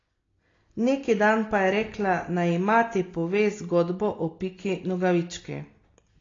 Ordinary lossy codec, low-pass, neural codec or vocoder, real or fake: AAC, 32 kbps; 7.2 kHz; none; real